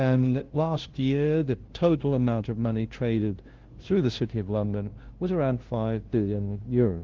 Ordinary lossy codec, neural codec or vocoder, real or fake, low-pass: Opus, 16 kbps; codec, 16 kHz, 0.5 kbps, FunCodec, trained on LibriTTS, 25 frames a second; fake; 7.2 kHz